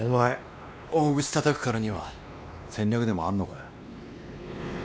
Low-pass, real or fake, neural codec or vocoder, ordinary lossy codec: none; fake; codec, 16 kHz, 2 kbps, X-Codec, WavLM features, trained on Multilingual LibriSpeech; none